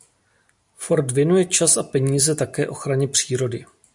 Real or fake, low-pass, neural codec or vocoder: real; 10.8 kHz; none